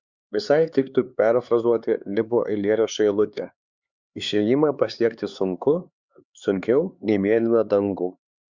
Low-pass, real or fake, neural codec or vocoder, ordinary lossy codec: 7.2 kHz; fake; codec, 16 kHz, 4 kbps, X-Codec, HuBERT features, trained on LibriSpeech; Opus, 64 kbps